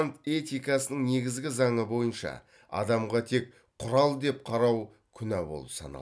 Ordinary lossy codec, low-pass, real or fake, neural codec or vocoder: none; none; real; none